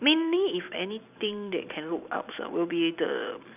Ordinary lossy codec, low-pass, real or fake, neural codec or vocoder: none; 3.6 kHz; fake; vocoder, 44.1 kHz, 128 mel bands every 256 samples, BigVGAN v2